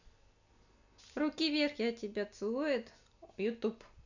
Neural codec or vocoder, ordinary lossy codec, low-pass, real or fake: none; none; 7.2 kHz; real